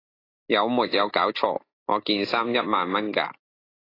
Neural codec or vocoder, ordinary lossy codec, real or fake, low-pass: none; AAC, 24 kbps; real; 5.4 kHz